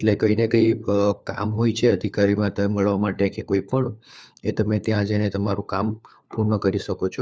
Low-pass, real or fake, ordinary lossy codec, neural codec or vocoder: none; fake; none; codec, 16 kHz, 4 kbps, FunCodec, trained on LibriTTS, 50 frames a second